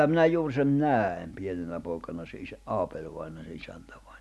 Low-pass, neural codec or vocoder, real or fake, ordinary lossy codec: none; none; real; none